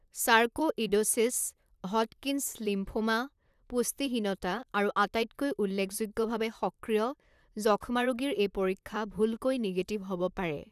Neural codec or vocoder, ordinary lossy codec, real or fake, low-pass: vocoder, 44.1 kHz, 128 mel bands, Pupu-Vocoder; none; fake; 14.4 kHz